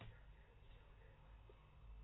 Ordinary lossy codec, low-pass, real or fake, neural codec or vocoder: AAC, 16 kbps; 7.2 kHz; real; none